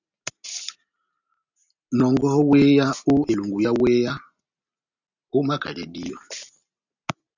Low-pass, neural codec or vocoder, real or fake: 7.2 kHz; none; real